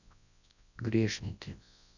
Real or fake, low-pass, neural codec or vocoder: fake; 7.2 kHz; codec, 24 kHz, 0.9 kbps, WavTokenizer, large speech release